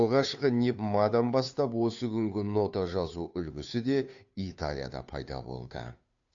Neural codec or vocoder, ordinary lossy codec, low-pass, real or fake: codec, 16 kHz, 2 kbps, FunCodec, trained on Chinese and English, 25 frames a second; AAC, 64 kbps; 7.2 kHz; fake